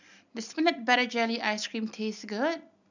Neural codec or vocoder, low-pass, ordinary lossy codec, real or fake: none; 7.2 kHz; none; real